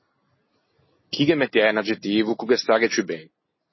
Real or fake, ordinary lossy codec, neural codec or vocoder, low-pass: real; MP3, 24 kbps; none; 7.2 kHz